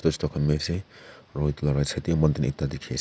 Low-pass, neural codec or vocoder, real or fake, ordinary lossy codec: none; none; real; none